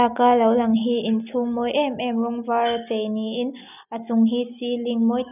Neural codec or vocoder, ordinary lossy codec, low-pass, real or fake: none; none; 3.6 kHz; real